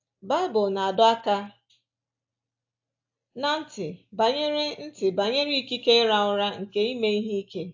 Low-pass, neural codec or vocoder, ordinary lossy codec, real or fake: 7.2 kHz; none; AAC, 48 kbps; real